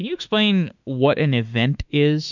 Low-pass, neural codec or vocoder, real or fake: 7.2 kHz; autoencoder, 48 kHz, 32 numbers a frame, DAC-VAE, trained on Japanese speech; fake